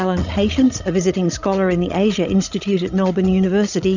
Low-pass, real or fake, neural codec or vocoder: 7.2 kHz; fake; codec, 16 kHz, 16 kbps, FreqCodec, larger model